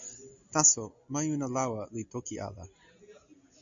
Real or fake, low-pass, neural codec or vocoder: real; 7.2 kHz; none